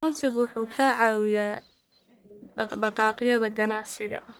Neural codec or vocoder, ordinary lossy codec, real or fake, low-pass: codec, 44.1 kHz, 1.7 kbps, Pupu-Codec; none; fake; none